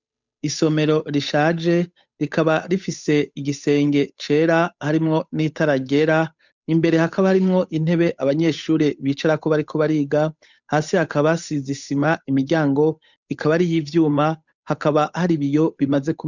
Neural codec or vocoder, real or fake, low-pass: codec, 16 kHz, 8 kbps, FunCodec, trained on Chinese and English, 25 frames a second; fake; 7.2 kHz